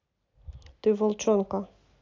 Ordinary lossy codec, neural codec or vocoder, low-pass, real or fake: AAC, 48 kbps; none; 7.2 kHz; real